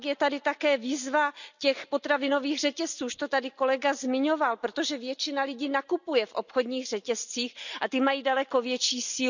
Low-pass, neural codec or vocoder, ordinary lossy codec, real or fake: 7.2 kHz; none; none; real